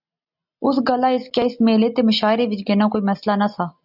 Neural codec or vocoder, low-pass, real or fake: none; 5.4 kHz; real